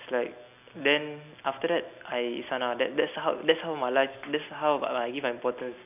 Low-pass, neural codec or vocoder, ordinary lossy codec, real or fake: 3.6 kHz; none; none; real